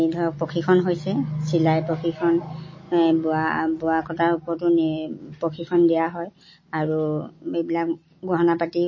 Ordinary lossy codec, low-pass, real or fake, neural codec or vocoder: MP3, 32 kbps; 7.2 kHz; real; none